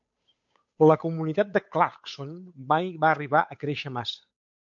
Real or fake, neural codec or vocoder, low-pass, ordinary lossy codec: fake; codec, 16 kHz, 8 kbps, FunCodec, trained on Chinese and English, 25 frames a second; 7.2 kHz; MP3, 48 kbps